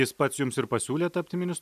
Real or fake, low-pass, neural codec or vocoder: real; 14.4 kHz; none